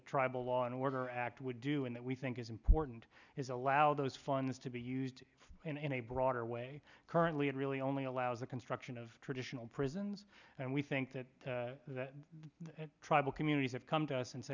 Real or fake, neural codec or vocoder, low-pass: real; none; 7.2 kHz